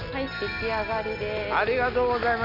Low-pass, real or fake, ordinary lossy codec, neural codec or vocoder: 5.4 kHz; real; none; none